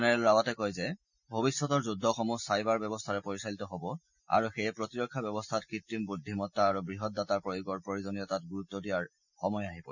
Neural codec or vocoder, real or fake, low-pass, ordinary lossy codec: none; real; 7.2 kHz; none